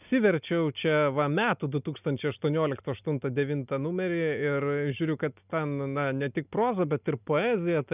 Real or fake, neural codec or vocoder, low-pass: real; none; 3.6 kHz